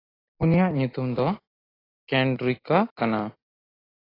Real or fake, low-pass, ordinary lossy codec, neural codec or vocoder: real; 5.4 kHz; AAC, 24 kbps; none